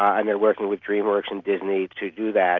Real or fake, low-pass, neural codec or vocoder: real; 7.2 kHz; none